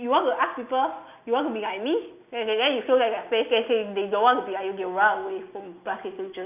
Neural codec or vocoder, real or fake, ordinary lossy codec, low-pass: none; real; none; 3.6 kHz